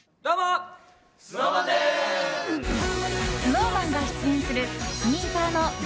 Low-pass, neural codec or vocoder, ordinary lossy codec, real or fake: none; none; none; real